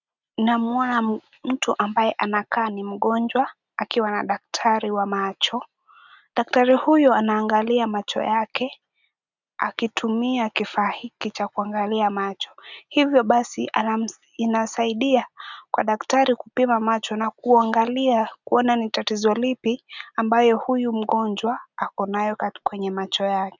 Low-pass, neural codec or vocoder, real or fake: 7.2 kHz; none; real